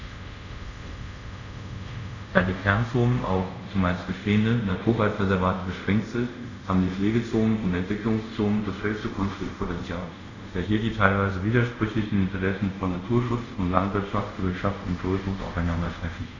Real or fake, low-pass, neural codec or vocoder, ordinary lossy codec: fake; 7.2 kHz; codec, 24 kHz, 0.5 kbps, DualCodec; none